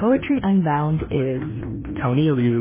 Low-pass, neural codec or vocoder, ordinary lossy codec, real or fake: 3.6 kHz; codec, 16 kHz, 1 kbps, FreqCodec, larger model; MP3, 16 kbps; fake